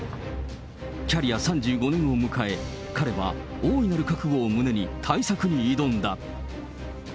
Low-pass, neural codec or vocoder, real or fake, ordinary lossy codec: none; none; real; none